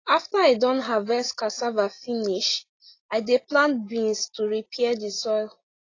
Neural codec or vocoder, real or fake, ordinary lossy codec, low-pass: none; real; AAC, 32 kbps; 7.2 kHz